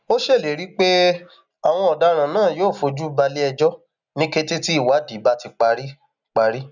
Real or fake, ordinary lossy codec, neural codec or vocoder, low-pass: real; none; none; 7.2 kHz